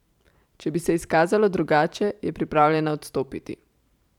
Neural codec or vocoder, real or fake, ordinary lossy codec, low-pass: vocoder, 44.1 kHz, 128 mel bands every 512 samples, BigVGAN v2; fake; none; 19.8 kHz